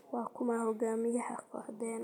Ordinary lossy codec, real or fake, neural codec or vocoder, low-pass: none; real; none; 19.8 kHz